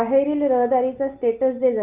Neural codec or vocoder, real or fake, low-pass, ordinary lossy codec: none; real; 3.6 kHz; Opus, 32 kbps